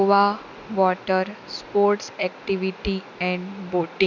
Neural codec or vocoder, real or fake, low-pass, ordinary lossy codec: none; real; 7.2 kHz; none